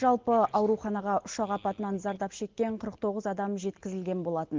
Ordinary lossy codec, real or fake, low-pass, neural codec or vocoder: Opus, 16 kbps; real; 7.2 kHz; none